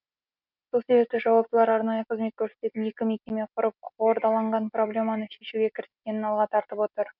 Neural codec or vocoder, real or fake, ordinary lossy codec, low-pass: none; real; none; 5.4 kHz